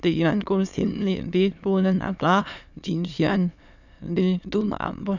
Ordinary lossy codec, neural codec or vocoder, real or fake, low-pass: none; autoencoder, 22.05 kHz, a latent of 192 numbers a frame, VITS, trained on many speakers; fake; 7.2 kHz